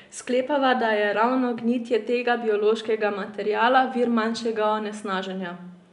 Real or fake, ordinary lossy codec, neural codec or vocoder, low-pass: real; none; none; 10.8 kHz